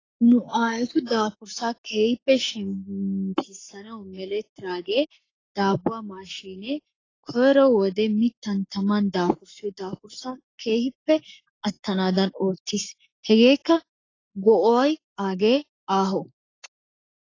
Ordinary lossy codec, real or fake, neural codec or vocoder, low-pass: AAC, 32 kbps; fake; codec, 44.1 kHz, 7.8 kbps, Pupu-Codec; 7.2 kHz